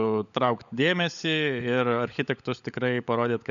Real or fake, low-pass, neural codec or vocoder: fake; 7.2 kHz; codec, 16 kHz, 16 kbps, FunCodec, trained on LibriTTS, 50 frames a second